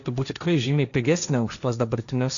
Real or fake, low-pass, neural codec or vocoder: fake; 7.2 kHz; codec, 16 kHz, 1.1 kbps, Voila-Tokenizer